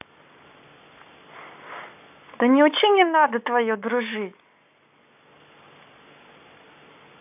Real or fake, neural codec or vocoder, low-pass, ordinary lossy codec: fake; codec, 44.1 kHz, 7.8 kbps, Pupu-Codec; 3.6 kHz; AAC, 32 kbps